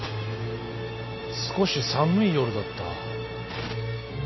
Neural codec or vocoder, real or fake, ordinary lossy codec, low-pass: none; real; MP3, 24 kbps; 7.2 kHz